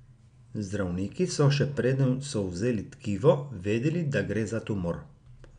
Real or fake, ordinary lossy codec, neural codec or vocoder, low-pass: real; none; none; 9.9 kHz